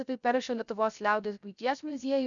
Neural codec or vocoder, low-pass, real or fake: codec, 16 kHz, 0.3 kbps, FocalCodec; 7.2 kHz; fake